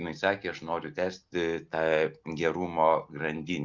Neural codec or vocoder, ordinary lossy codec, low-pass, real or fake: none; Opus, 24 kbps; 7.2 kHz; real